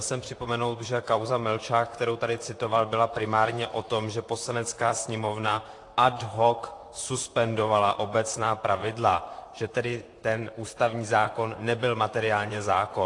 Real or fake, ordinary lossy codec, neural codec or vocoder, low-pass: fake; AAC, 48 kbps; vocoder, 44.1 kHz, 128 mel bands, Pupu-Vocoder; 10.8 kHz